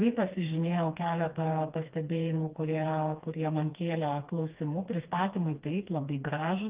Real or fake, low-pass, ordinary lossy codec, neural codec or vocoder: fake; 3.6 kHz; Opus, 32 kbps; codec, 16 kHz, 2 kbps, FreqCodec, smaller model